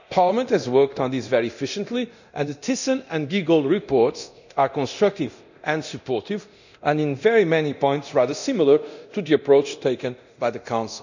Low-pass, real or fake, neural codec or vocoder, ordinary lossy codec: 7.2 kHz; fake; codec, 24 kHz, 0.9 kbps, DualCodec; none